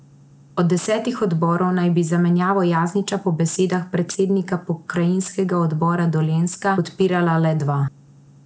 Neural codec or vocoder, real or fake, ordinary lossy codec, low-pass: none; real; none; none